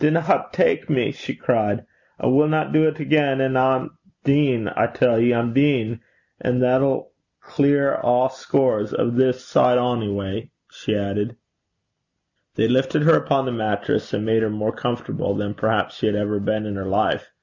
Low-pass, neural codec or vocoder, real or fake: 7.2 kHz; none; real